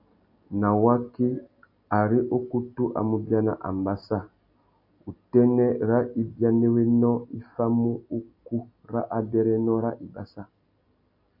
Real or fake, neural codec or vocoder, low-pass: real; none; 5.4 kHz